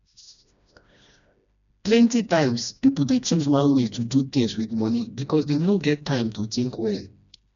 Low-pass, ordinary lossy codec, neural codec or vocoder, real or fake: 7.2 kHz; none; codec, 16 kHz, 1 kbps, FreqCodec, smaller model; fake